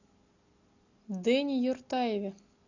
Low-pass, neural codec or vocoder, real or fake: 7.2 kHz; none; real